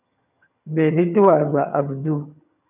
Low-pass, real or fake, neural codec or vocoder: 3.6 kHz; fake; vocoder, 22.05 kHz, 80 mel bands, HiFi-GAN